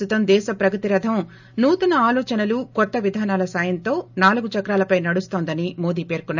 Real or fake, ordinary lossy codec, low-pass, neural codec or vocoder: real; none; 7.2 kHz; none